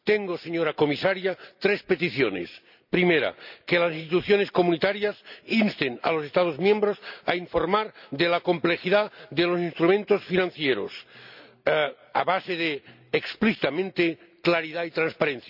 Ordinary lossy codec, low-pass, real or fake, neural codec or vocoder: none; 5.4 kHz; real; none